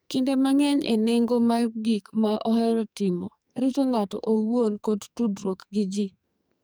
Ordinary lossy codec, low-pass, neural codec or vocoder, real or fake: none; none; codec, 44.1 kHz, 2.6 kbps, SNAC; fake